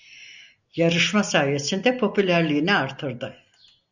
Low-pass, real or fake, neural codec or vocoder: 7.2 kHz; real; none